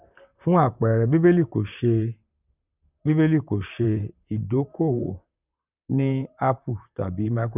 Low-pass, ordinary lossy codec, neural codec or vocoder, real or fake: 3.6 kHz; none; vocoder, 44.1 kHz, 128 mel bands, Pupu-Vocoder; fake